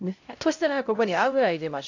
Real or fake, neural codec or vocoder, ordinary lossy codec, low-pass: fake; codec, 16 kHz, 0.5 kbps, X-Codec, HuBERT features, trained on LibriSpeech; AAC, 48 kbps; 7.2 kHz